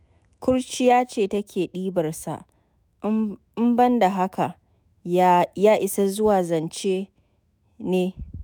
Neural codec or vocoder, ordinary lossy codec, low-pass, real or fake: autoencoder, 48 kHz, 128 numbers a frame, DAC-VAE, trained on Japanese speech; none; none; fake